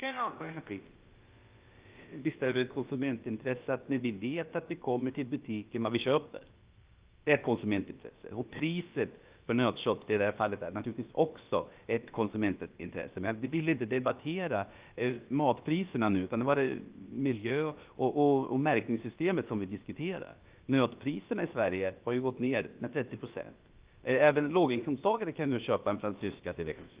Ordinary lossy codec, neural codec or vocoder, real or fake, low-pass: Opus, 32 kbps; codec, 16 kHz, about 1 kbps, DyCAST, with the encoder's durations; fake; 3.6 kHz